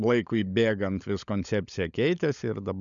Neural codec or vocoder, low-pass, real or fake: codec, 16 kHz, 16 kbps, FunCodec, trained on LibriTTS, 50 frames a second; 7.2 kHz; fake